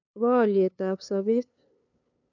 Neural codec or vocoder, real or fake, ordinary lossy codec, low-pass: codec, 16 kHz, 2 kbps, FunCodec, trained on LibriTTS, 25 frames a second; fake; none; 7.2 kHz